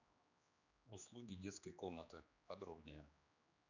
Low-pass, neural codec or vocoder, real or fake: 7.2 kHz; codec, 16 kHz, 2 kbps, X-Codec, HuBERT features, trained on general audio; fake